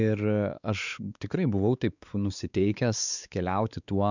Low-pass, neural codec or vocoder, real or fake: 7.2 kHz; codec, 16 kHz, 4 kbps, X-Codec, WavLM features, trained on Multilingual LibriSpeech; fake